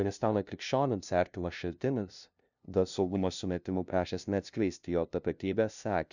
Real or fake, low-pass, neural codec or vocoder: fake; 7.2 kHz; codec, 16 kHz, 0.5 kbps, FunCodec, trained on LibriTTS, 25 frames a second